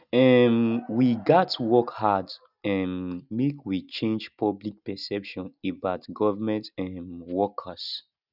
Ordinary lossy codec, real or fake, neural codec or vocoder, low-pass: none; real; none; 5.4 kHz